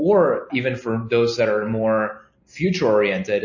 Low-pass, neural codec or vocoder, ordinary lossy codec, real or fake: 7.2 kHz; none; MP3, 32 kbps; real